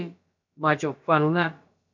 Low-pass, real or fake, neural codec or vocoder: 7.2 kHz; fake; codec, 16 kHz, about 1 kbps, DyCAST, with the encoder's durations